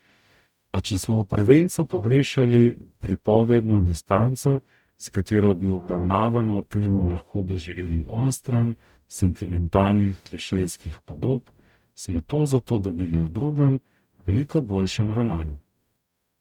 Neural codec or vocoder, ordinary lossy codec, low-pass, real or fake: codec, 44.1 kHz, 0.9 kbps, DAC; none; 19.8 kHz; fake